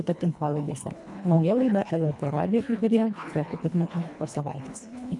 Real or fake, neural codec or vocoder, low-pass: fake; codec, 24 kHz, 1.5 kbps, HILCodec; 10.8 kHz